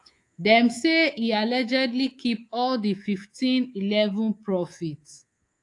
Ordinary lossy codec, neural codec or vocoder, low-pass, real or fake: AAC, 48 kbps; codec, 24 kHz, 3.1 kbps, DualCodec; 10.8 kHz; fake